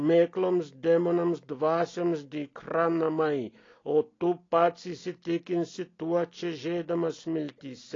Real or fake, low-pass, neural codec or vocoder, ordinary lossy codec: real; 7.2 kHz; none; AAC, 32 kbps